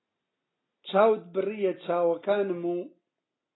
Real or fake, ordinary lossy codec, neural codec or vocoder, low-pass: real; AAC, 16 kbps; none; 7.2 kHz